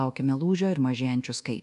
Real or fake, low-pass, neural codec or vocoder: fake; 10.8 kHz; codec, 24 kHz, 1.2 kbps, DualCodec